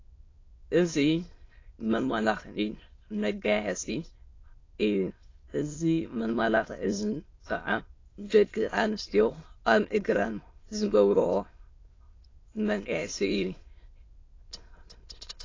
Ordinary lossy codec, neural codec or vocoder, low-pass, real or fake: AAC, 32 kbps; autoencoder, 22.05 kHz, a latent of 192 numbers a frame, VITS, trained on many speakers; 7.2 kHz; fake